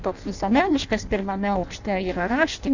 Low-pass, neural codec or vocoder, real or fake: 7.2 kHz; codec, 16 kHz in and 24 kHz out, 0.6 kbps, FireRedTTS-2 codec; fake